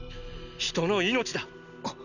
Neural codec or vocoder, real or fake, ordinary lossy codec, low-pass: none; real; none; 7.2 kHz